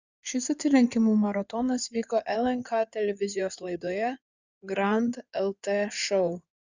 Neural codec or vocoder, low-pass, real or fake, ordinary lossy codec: codec, 16 kHz in and 24 kHz out, 2.2 kbps, FireRedTTS-2 codec; 7.2 kHz; fake; Opus, 64 kbps